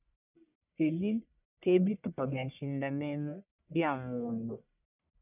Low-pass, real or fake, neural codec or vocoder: 3.6 kHz; fake; codec, 44.1 kHz, 1.7 kbps, Pupu-Codec